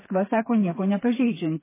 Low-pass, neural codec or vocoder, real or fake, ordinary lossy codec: 3.6 kHz; codec, 16 kHz, 4 kbps, FreqCodec, smaller model; fake; MP3, 16 kbps